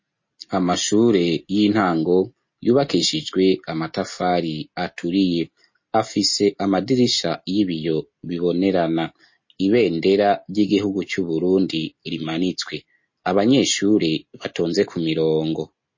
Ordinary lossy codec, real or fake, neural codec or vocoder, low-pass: MP3, 32 kbps; real; none; 7.2 kHz